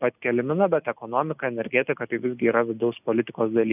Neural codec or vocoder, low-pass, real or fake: none; 3.6 kHz; real